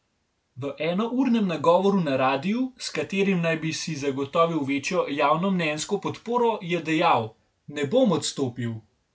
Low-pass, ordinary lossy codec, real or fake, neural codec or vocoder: none; none; real; none